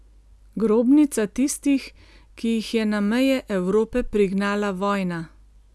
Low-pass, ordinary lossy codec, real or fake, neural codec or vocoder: none; none; real; none